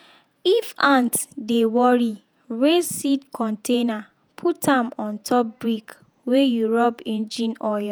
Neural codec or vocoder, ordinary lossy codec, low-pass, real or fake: vocoder, 48 kHz, 128 mel bands, Vocos; none; none; fake